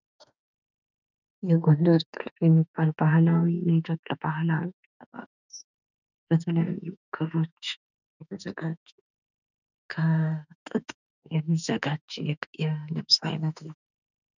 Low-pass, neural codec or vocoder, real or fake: 7.2 kHz; autoencoder, 48 kHz, 32 numbers a frame, DAC-VAE, trained on Japanese speech; fake